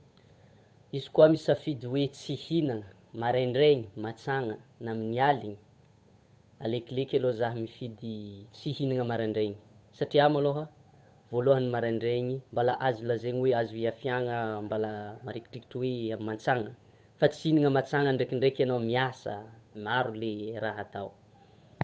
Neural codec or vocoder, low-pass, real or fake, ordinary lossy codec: codec, 16 kHz, 8 kbps, FunCodec, trained on Chinese and English, 25 frames a second; none; fake; none